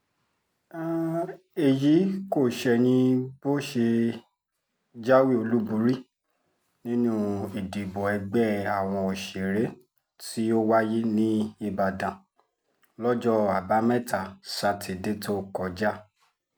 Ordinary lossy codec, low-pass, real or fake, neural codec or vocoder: none; none; real; none